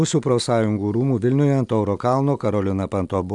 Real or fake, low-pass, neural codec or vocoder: real; 10.8 kHz; none